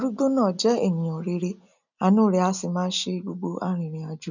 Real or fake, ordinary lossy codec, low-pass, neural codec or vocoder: real; none; 7.2 kHz; none